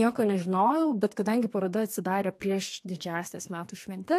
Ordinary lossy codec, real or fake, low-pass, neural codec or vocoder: AAC, 64 kbps; fake; 14.4 kHz; codec, 32 kHz, 1.9 kbps, SNAC